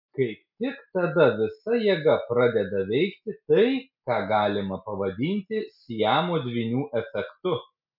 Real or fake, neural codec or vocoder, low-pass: real; none; 5.4 kHz